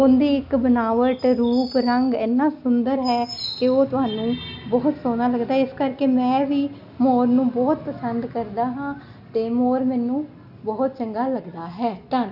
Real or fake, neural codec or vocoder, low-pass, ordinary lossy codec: real; none; 5.4 kHz; none